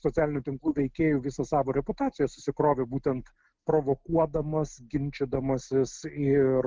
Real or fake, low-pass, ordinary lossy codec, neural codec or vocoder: real; 7.2 kHz; Opus, 16 kbps; none